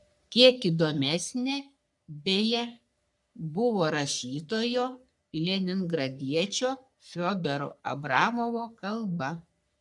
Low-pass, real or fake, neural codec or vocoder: 10.8 kHz; fake; codec, 44.1 kHz, 3.4 kbps, Pupu-Codec